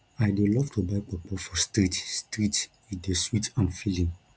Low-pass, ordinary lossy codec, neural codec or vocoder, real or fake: none; none; none; real